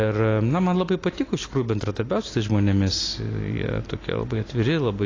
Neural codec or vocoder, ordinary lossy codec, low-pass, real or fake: none; AAC, 32 kbps; 7.2 kHz; real